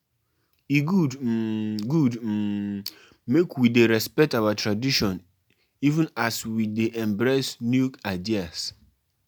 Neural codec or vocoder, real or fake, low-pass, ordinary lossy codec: none; real; none; none